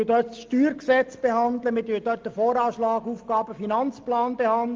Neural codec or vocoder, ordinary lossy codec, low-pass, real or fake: none; Opus, 32 kbps; 7.2 kHz; real